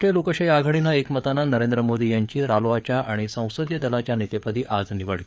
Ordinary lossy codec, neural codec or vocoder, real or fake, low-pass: none; codec, 16 kHz, 4 kbps, FreqCodec, larger model; fake; none